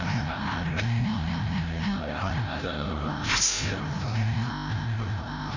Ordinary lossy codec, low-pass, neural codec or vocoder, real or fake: none; 7.2 kHz; codec, 16 kHz, 0.5 kbps, FreqCodec, larger model; fake